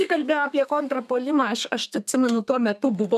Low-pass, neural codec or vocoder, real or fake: 14.4 kHz; codec, 32 kHz, 1.9 kbps, SNAC; fake